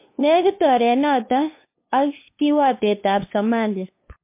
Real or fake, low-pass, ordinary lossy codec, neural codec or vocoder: fake; 3.6 kHz; MP3, 24 kbps; codec, 16 kHz, 2 kbps, FunCodec, trained on LibriTTS, 25 frames a second